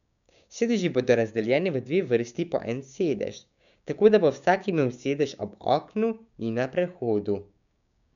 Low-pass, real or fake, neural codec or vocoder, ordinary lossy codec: 7.2 kHz; fake; codec, 16 kHz, 6 kbps, DAC; none